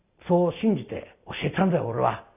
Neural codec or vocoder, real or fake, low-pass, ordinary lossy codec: none; real; 3.6 kHz; none